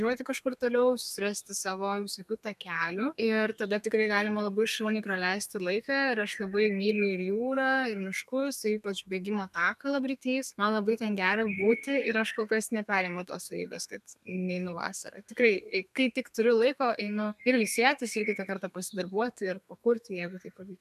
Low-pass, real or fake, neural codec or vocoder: 14.4 kHz; fake; codec, 32 kHz, 1.9 kbps, SNAC